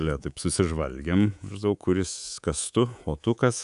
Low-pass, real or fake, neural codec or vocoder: 10.8 kHz; fake; codec, 24 kHz, 3.1 kbps, DualCodec